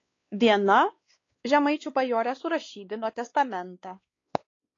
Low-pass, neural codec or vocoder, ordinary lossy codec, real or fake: 7.2 kHz; codec, 16 kHz, 2 kbps, X-Codec, WavLM features, trained on Multilingual LibriSpeech; AAC, 32 kbps; fake